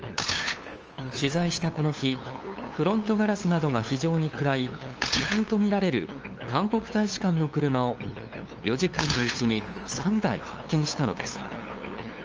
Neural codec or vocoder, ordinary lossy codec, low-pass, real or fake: codec, 16 kHz, 2 kbps, FunCodec, trained on LibriTTS, 25 frames a second; Opus, 24 kbps; 7.2 kHz; fake